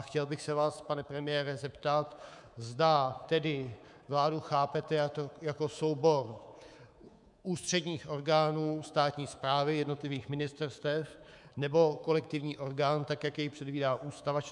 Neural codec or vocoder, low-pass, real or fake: codec, 24 kHz, 3.1 kbps, DualCodec; 10.8 kHz; fake